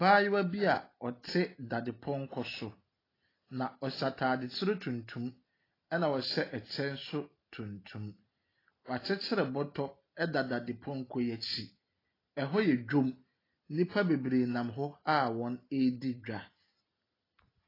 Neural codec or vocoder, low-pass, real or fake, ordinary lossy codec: none; 5.4 kHz; real; AAC, 24 kbps